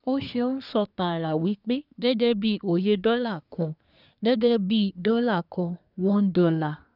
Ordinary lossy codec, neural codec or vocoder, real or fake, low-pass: none; codec, 24 kHz, 1 kbps, SNAC; fake; 5.4 kHz